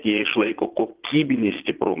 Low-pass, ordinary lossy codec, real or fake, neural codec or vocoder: 3.6 kHz; Opus, 16 kbps; fake; vocoder, 22.05 kHz, 80 mel bands, Vocos